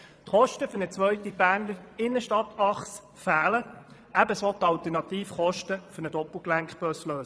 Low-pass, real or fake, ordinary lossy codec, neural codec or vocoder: none; fake; none; vocoder, 22.05 kHz, 80 mel bands, Vocos